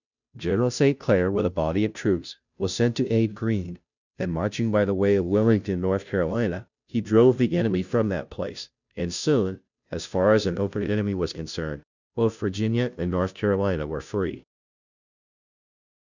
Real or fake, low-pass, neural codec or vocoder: fake; 7.2 kHz; codec, 16 kHz, 0.5 kbps, FunCodec, trained on Chinese and English, 25 frames a second